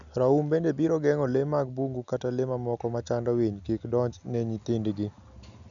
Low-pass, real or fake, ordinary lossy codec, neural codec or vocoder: 7.2 kHz; real; none; none